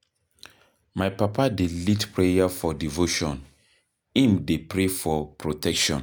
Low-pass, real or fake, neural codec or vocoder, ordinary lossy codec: none; real; none; none